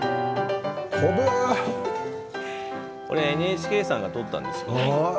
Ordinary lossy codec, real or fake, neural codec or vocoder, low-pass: none; real; none; none